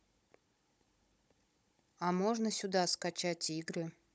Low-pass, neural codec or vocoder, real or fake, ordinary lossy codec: none; codec, 16 kHz, 16 kbps, FunCodec, trained on Chinese and English, 50 frames a second; fake; none